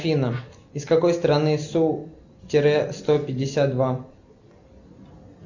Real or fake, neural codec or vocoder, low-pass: real; none; 7.2 kHz